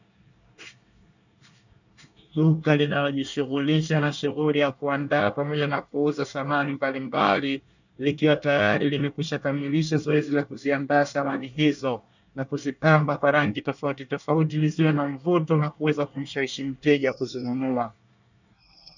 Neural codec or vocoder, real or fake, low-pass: codec, 24 kHz, 1 kbps, SNAC; fake; 7.2 kHz